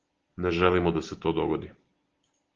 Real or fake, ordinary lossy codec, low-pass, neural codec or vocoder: real; Opus, 16 kbps; 7.2 kHz; none